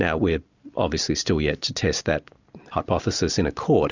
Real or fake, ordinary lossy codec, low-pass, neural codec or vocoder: real; Opus, 64 kbps; 7.2 kHz; none